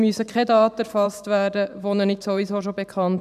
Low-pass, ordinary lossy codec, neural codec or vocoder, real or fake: 14.4 kHz; none; vocoder, 44.1 kHz, 128 mel bands every 512 samples, BigVGAN v2; fake